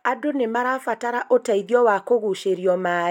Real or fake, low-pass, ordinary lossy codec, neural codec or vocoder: real; 19.8 kHz; none; none